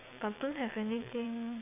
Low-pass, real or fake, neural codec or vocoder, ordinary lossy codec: 3.6 kHz; fake; vocoder, 22.05 kHz, 80 mel bands, WaveNeXt; none